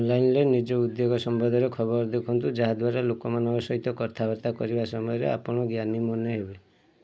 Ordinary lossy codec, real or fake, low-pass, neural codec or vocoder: none; real; none; none